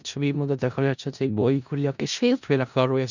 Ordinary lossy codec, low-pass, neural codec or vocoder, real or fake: none; 7.2 kHz; codec, 16 kHz in and 24 kHz out, 0.4 kbps, LongCat-Audio-Codec, four codebook decoder; fake